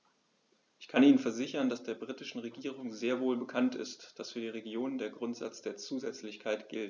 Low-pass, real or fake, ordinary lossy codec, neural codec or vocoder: 7.2 kHz; real; none; none